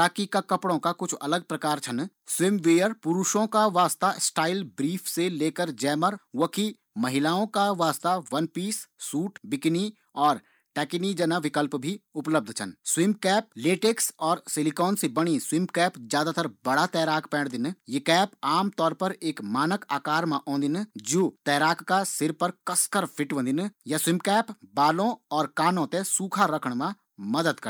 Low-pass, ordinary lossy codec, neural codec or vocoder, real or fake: none; none; none; real